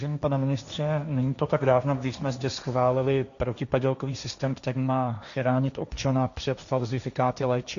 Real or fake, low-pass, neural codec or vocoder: fake; 7.2 kHz; codec, 16 kHz, 1.1 kbps, Voila-Tokenizer